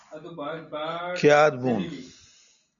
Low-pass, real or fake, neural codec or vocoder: 7.2 kHz; real; none